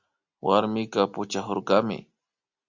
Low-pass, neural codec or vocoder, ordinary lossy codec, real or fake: 7.2 kHz; none; Opus, 64 kbps; real